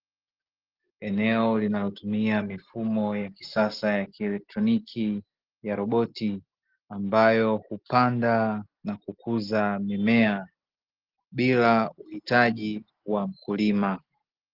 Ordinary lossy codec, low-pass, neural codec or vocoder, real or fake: Opus, 16 kbps; 5.4 kHz; none; real